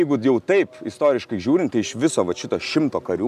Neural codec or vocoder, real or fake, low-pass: none; real; 14.4 kHz